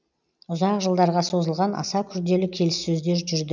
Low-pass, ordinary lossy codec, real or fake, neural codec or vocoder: 7.2 kHz; none; real; none